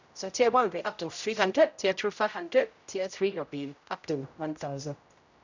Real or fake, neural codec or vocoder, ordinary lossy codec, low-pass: fake; codec, 16 kHz, 0.5 kbps, X-Codec, HuBERT features, trained on general audio; none; 7.2 kHz